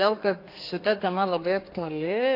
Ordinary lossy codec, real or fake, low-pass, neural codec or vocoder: AAC, 32 kbps; fake; 5.4 kHz; codec, 32 kHz, 1.9 kbps, SNAC